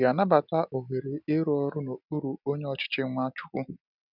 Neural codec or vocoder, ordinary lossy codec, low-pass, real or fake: none; none; 5.4 kHz; real